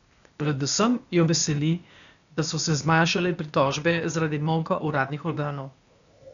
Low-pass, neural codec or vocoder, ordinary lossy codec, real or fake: 7.2 kHz; codec, 16 kHz, 0.8 kbps, ZipCodec; none; fake